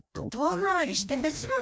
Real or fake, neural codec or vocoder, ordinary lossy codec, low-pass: fake; codec, 16 kHz, 1 kbps, FreqCodec, larger model; none; none